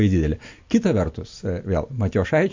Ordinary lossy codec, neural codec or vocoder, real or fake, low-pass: MP3, 48 kbps; none; real; 7.2 kHz